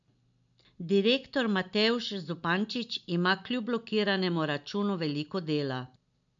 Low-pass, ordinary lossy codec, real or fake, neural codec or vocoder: 7.2 kHz; MP3, 64 kbps; real; none